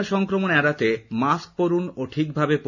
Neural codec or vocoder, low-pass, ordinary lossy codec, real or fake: none; 7.2 kHz; none; real